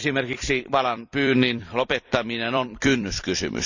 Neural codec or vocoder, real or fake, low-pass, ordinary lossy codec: vocoder, 44.1 kHz, 128 mel bands every 256 samples, BigVGAN v2; fake; 7.2 kHz; none